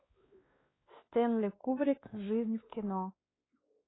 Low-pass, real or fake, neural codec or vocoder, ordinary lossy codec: 7.2 kHz; fake; codec, 16 kHz, 2 kbps, X-Codec, WavLM features, trained on Multilingual LibriSpeech; AAC, 16 kbps